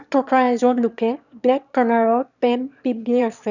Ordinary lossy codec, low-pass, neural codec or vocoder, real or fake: none; 7.2 kHz; autoencoder, 22.05 kHz, a latent of 192 numbers a frame, VITS, trained on one speaker; fake